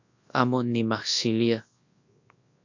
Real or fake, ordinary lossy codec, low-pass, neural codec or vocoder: fake; AAC, 48 kbps; 7.2 kHz; codec, 24 kHz, 0.9 kbps, WavTokenizer, large speech release